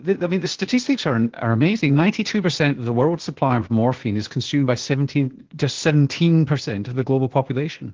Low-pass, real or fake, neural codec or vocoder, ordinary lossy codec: 7.2 kHz; fake; codec, 16 kHz, 0.8 kbps, ZipCodec; Opus, 16 kbps